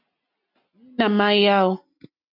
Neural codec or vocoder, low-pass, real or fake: none; 5.4 kHz; real